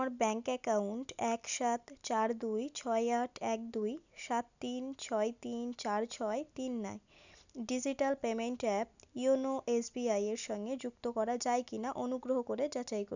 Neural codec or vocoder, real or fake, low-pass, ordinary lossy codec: none; real; 7.2 kHz; none